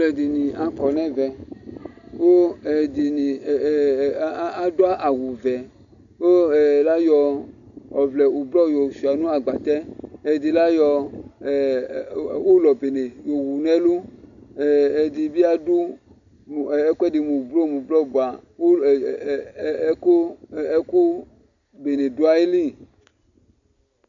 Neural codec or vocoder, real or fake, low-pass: none; real; 7.2 kHz